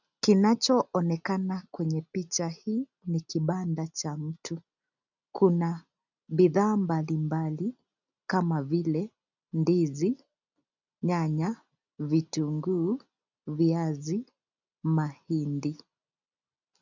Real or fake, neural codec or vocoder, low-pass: real; none; 7.2 kHz